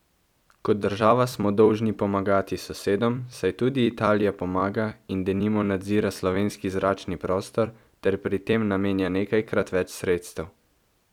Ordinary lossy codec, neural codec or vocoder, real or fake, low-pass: none; vocoder, 44.1 kHz, 128 mel bands every 256 samples, BigVGAN v2; fake; 19.8 kHz